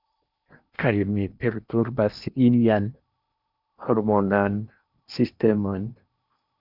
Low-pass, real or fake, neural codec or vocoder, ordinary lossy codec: 5.4 kHz; fake; codec, 16 kHz in and 24 kHz out, 0.8 kbps, FocalCodec, streaming, 65536 codes; Opus, 64 kbps